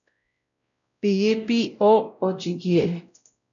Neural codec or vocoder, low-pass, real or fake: codec, 16 kHz, 0.5 kbps, X-Codec, WavLM features, trained on Multilingual LibriSpeech; 7.2 kHz; fake